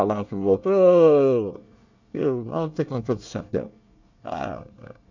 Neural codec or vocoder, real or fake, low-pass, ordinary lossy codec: codec, 24 kHz, 1 kbps, SNAC; fake; 7.2 kHz; none